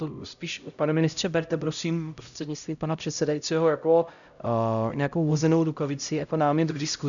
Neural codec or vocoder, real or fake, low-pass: codec, 16 kHz, 0.5 kbps, X-Codec, HuBERT features, trained on LibriSpeech; fake; 7.2 kHz